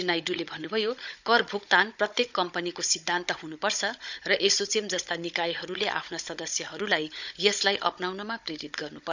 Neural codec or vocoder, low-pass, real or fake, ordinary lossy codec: codec, 16 kHz, 16 kbps, FunCodec, trained on Chinese and English, 50 frames a second; 7.2 kHz; fake; none